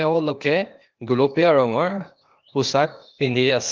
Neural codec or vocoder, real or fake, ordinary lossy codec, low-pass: codec, 16 kHz, 0.8 kbps, ZipCodec; fake; Opus, 32 kbps; 7.2 kHz